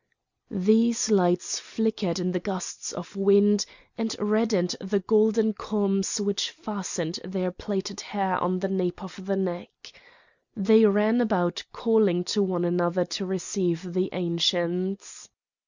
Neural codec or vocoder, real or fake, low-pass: none; real; 7.2 kHz